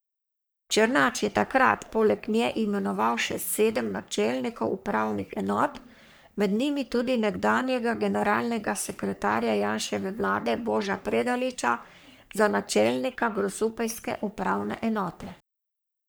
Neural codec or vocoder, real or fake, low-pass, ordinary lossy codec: codec, 44.1 kHz, 3.4 kbps, Pupu-Codec; fake; none; none